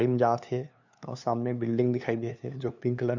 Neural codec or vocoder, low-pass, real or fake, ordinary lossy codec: codec, 16 kHz, 2 kbps, FunCodec, trained on LibriTTS, 25 frames a second; 7.2 kHz; fake; none